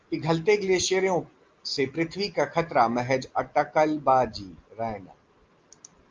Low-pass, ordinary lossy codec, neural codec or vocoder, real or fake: 7.2 kHz; Opus, 24 kbps; none; real